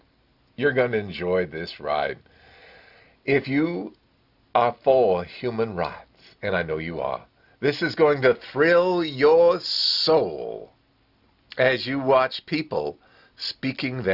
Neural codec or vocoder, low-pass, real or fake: none; 5.4 kHz; real